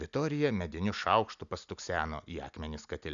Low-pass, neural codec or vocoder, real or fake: 7.2 kHz; none; real